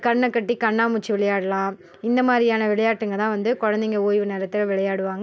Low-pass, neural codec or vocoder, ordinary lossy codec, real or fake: none; none; none; real